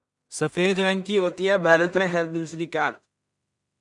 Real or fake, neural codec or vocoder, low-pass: fake; codec, 16 kHz in and 24 kHz out, 0.4 kbps, LongCat-Audio-Codec, two codebook decoder; 10.8 kHz